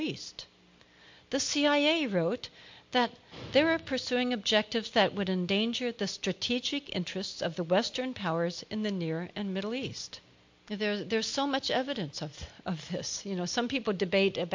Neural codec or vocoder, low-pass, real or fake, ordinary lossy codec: none; 7.2 kHz; real; MP3, 48 kbps